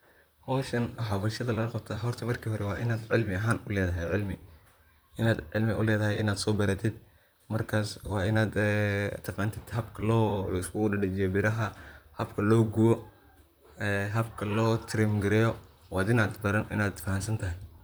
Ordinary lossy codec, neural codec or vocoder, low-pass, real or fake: none; vocoder, 44.1 kHz, 128 mel bands, Pupu-Vocoder; none; fake